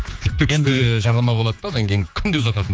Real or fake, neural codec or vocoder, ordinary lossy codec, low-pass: fake; codec, 16 kHz, 4 kbps, X-Codec, HuBERT features, trained on general audio; none; none